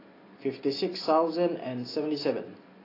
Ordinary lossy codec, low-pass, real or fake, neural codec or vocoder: AAC, 24 kbps; 5.4 kHz; real; none